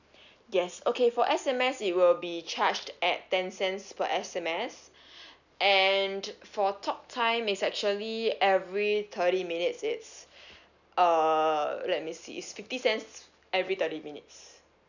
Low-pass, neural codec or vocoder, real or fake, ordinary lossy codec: 7.2 kHz; none; real; none